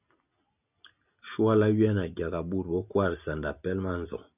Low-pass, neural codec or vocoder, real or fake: 3.6 kHz; none; real